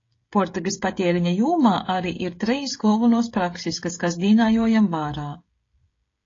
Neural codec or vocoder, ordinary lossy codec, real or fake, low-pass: codec, 16 kHz, 16 kbps, FreqCodec, smaller model; AAC, 32 kbps; fake; 7.2 kHz